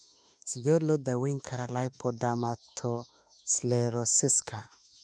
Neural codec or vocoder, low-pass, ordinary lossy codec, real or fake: autoencoder, 48 kHz, 32 numbers a frame, DAC-VAE, trained on Japanese speech; 9.9 kHz; none; fake